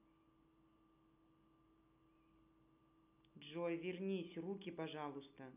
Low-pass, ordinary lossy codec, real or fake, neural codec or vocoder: 3.6 kHz; none; real; none